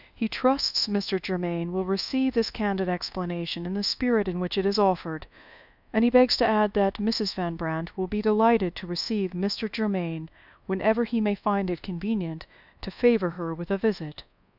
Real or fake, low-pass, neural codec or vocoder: fake; 5.4 kHz; codec, 24 kHz, 1.2 kbps, DualCodec